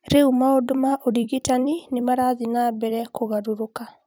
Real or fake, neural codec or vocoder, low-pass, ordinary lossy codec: fake; vocoder, 44.1 kHz, 128 mel bands every 256 samples, BigVGAN v2; none; none